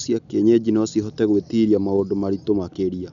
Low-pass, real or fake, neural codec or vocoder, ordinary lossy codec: 7.2 kHz; real; none; none